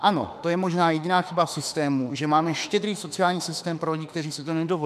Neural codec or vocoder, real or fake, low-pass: autoencoder, 48 kHz, 32 numbers a frame, DAC-VAE, trained on Japanese speech; fake; 14.4 kHz